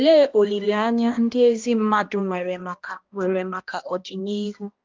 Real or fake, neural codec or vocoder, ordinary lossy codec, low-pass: fake; codec, 16 kHz, 1 kbps, X-Codec, HuBERT features, trained on balanced general audio; Opus, 24 kbps; 7.2 kHz